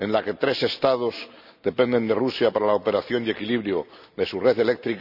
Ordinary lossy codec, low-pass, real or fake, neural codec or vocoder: none; 5.4 kHz; real; none